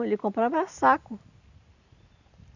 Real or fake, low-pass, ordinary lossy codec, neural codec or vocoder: real; 7.2 kHz; none; none